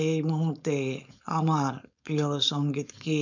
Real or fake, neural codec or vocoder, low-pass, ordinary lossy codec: fake; codec, 16 kHz, 4.8 kbps, FACodec; 7.2 kHz; none